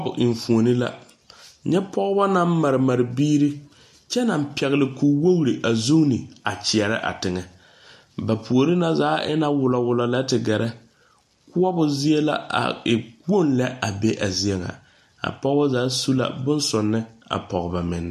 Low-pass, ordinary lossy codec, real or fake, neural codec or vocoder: 14.4 kHz; MP3, 64 kbps; real; none